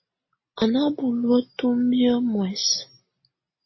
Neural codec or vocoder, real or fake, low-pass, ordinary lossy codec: none; real; 7.2 kHz; MP3, 24 kbps